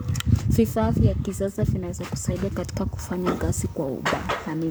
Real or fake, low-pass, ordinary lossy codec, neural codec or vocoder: fake; none; none; codec, 44.1 kHz, 7.8 kbps, Pupu-Codec